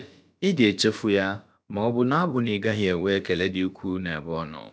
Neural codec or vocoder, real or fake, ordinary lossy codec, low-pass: codec, 16 kHz, about 1 kbps, DyCAST, with the encoder's durations; fake; none; none